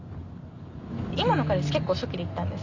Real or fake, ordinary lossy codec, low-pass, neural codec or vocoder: real; Opus, 64 kbps; 7.2 kHz; none